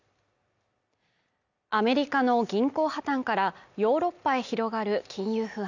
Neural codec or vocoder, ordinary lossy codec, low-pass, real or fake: none; none; 7.2 kHz; real